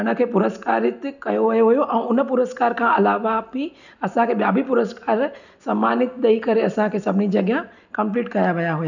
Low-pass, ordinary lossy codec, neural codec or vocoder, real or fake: 7.2 kHz; none; none; real